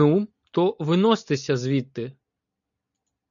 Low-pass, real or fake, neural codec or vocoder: 7.2 kHz; real; none